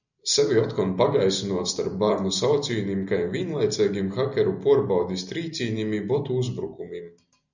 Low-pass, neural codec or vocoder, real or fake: 7.2 kHz; none; real